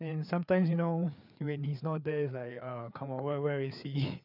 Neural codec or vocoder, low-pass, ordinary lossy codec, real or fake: codec, 16 kHz, 4 kbps, FreqCodec, larger model; 5.4 kHz; none; fake